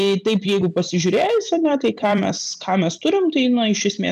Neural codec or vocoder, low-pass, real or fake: vocoder, 44.1 kHz, 128 mel bands every 256 samples, BigVGAN v2; 14.4 kHz; fake